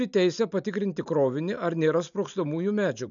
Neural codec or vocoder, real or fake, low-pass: none; real; 7.2 kHz